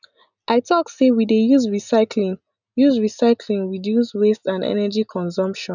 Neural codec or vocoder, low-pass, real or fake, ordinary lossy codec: none; 7.2 kHz; real; none